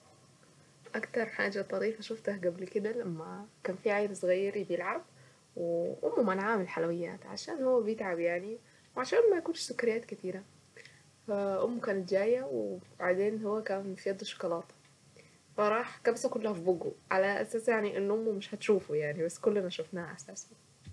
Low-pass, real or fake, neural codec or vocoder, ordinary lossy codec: none; real; none; none